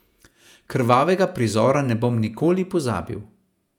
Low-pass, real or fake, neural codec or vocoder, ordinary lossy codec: 19.8 kHz; fake; vocoder, 48 kHz, 128 mel bands, Vocos; none